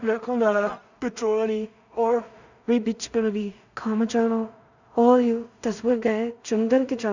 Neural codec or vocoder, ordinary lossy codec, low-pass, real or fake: codec, 16 kHz in and 24 kHz out, 0.4 kbps, LongCat-Audio-Codec, two codebook decoder; none; 7.2 kHz; fake